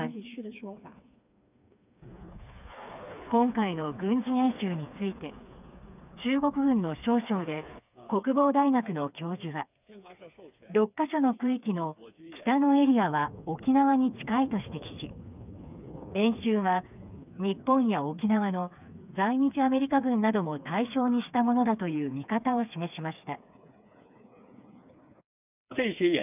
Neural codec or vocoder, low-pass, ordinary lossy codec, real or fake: codec, 16 kHz, 4 kbps, FreqCodec, smaller model; 3.6 kHz; none; fake